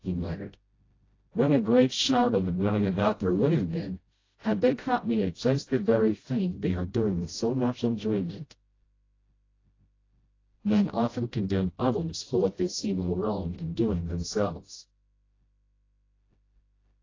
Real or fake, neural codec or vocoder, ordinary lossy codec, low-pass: fake; codec, 16 kHz, 0.5 kbps, FreqCodec, smaller model; AAC, 32 kbps; 7.2 kHz